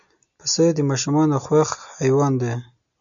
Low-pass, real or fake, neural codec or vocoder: 7.2 kHz; real; none